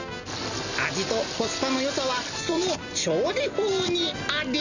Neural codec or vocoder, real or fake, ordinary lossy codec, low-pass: none; real; none; 7.2 kHz